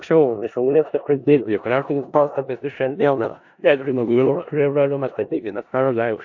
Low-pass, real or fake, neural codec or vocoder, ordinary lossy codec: 7.2 kHz; fake; codec, 16 kHz in and 24 kHz out, 0.4 kbps, LongCat-Audio-Codec, four codebook decoder; AAC, 48 kbps